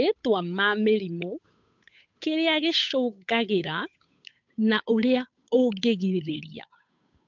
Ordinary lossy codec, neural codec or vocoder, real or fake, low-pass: MP3, 64 kbps; codec, 16 kHz, 8 kbps, FunCodec, trained on Chinese and English, 25 frames a second; fake; 7.2 kHz